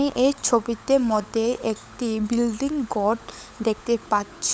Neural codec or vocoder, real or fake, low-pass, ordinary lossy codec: codec, 16 kHz, 16 kbps, FunCodec, trained on LibriTTS, 50 frames a second; fake; none; none